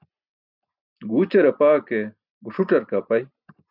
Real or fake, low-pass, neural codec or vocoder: real; 5.4 kHz; none